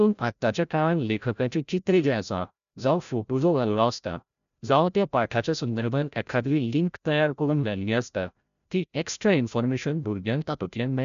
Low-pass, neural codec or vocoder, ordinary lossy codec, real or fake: 7.2 kHz; codec, 16 kHz, 0.5 kbps, FreqCodec, larger model; none; fake